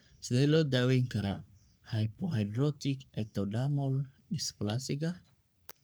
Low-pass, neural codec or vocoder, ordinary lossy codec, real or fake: none; codec, 44.1 kHz, 3.4 kbps, Pupu-Codec; none; fake